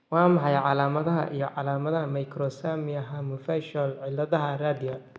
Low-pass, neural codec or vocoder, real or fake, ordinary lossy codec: none; none; real; none